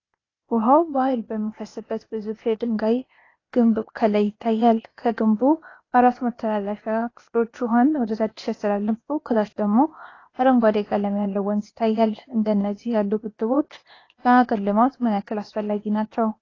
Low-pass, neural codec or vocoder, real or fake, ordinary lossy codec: 7.2 kHz; codec, 16 kHz, 0.8 kbps, ZipCodec; fake; AAC, 32 kbps